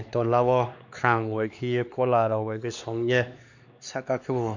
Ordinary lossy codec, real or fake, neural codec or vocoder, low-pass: none; fake; codec, 16 kHz, 2 kbps, X-Codec, HuBERT features, trained on LibriSpeech; 7.2 kHz